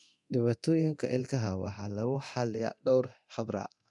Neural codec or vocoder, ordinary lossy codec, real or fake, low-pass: codec, 24 kHz, 0.9 kbps, DualCodec; none; fake; 10.8 kHz